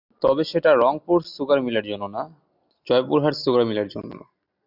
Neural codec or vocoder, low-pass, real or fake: none; 5.4 kHz; real